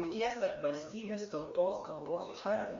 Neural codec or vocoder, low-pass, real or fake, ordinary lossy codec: codec, 16 kHz, 1 kbps, FreqCodec, larger model; 7.2 kHz; fake; MP3, 48 kbps